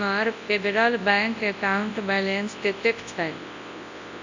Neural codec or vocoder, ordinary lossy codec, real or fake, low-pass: codec, 24 kHz, 0.9 kbps, WavTokenizer, large speech release; AAC, 48 kbps; fake; 7.2 kHz